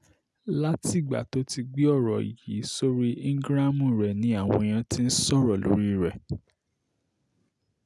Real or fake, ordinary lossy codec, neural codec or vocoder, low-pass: real; none; none; none